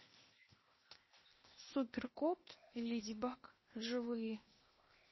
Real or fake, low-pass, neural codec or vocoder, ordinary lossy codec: fake; 7.2 kHz; codec, 16 kHz, 0.8 kbps, ZipCodec; MP3, 24 kbps